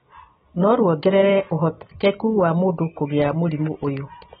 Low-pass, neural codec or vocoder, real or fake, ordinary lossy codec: 10.8 kHz; none; real; AAC, 16 kbps